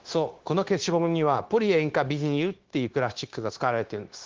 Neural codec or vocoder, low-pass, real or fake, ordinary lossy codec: codec, 16 kHz, 0.9 kbps, LongCat-Audio-Codec; 7.2 kHz; fake; Opus, 16 kbps